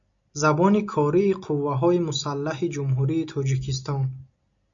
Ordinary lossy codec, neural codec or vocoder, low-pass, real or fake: AAC, 64 kbps; none; 7.2 kHz; real